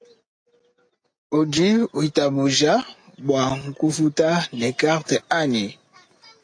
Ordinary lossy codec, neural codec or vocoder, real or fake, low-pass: AAC, 48 kbps; none; real; 9.9 kHz